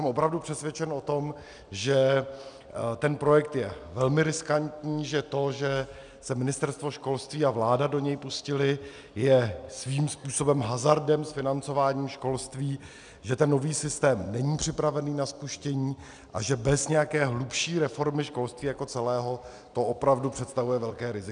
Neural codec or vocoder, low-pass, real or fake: none; 9.9 kHz; real